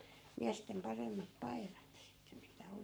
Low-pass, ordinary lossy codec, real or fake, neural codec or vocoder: none; none; fake; codec, 44.1 kHz, 7.8 kbps, Pupu-Codec